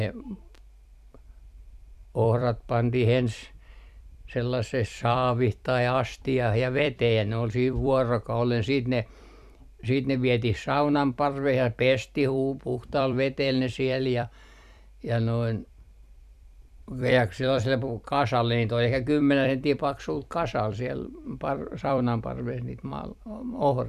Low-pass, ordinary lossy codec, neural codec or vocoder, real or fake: 14.4 kHz; none; vocoder, 44.1 kHz, 128 mel bands every 256 samples, BigVGAN v2; fake